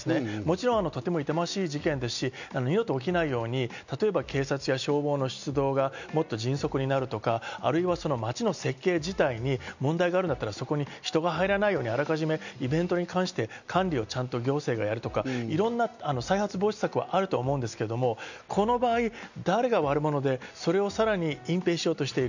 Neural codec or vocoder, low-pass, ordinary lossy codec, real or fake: none; 7.2 kHz; none; real